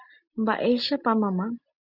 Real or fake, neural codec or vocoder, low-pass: real; none; 5.4 kHz